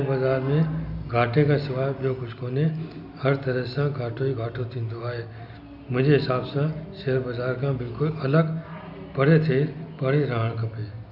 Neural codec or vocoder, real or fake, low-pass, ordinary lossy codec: none; real; 5.4 kHz; none